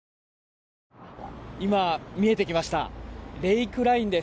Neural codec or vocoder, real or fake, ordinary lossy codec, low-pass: none; real; none; none